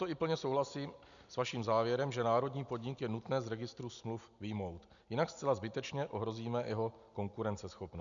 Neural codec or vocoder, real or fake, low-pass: none; real; 7.2 kHz